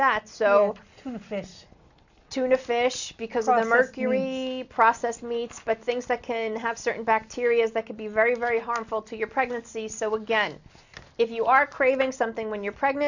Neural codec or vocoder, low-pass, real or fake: none; 7.2 kHz; real